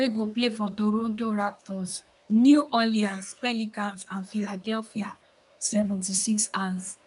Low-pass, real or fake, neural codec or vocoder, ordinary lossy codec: 10.8 kHz; fake; codec, 24 kHz, 1 kbps, SNAC; none